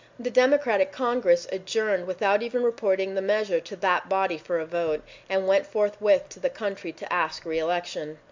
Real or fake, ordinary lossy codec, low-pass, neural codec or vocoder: real; MP3, 64 kbps; 7.2 kHz; none